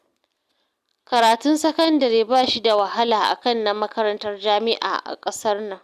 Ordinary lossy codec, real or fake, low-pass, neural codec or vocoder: Opus, 64 kbps; real; 14.4 kHz; none